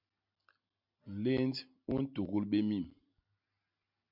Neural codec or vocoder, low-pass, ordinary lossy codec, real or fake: none; 5.4 kHz; MP3, 48 kbps; real